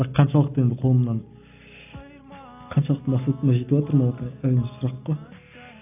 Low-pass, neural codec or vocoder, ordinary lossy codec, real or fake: 3.6 kHz; none; AAC, 32 kbps; real